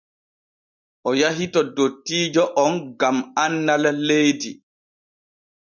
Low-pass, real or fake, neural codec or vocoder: 7.2 kHz; real; none